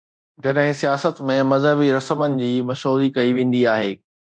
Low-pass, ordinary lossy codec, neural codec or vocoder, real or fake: 9.9 kHz; MP3, 64 kbps; codec, 24 kHz, 0.9 kbps, DualCodec; fake